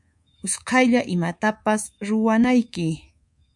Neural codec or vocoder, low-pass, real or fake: codec, 24 kHz, 3.1 kbps, DualCodec; 10.8 kHz; fake